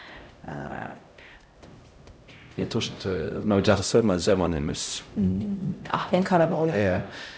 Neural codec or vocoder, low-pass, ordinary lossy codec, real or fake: codec, 16 kHz, 0.5 kbps, X-Codec, HuBERT features, trained on LibriSpeech; none; none; fake